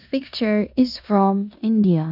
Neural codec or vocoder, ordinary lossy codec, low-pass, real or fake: codec, 16 kHz in and 24 kHz out, 0.9 kbps, LongCat-Audio-Codec, four codebook decoder; none; 5.4 kHz; fake